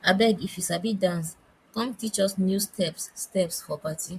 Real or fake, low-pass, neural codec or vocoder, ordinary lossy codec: fake; 14.4 kHz; vocoder, 44.1 kHz, 128 mel bands, Pupu-Vocoder; MP3, 96 kbps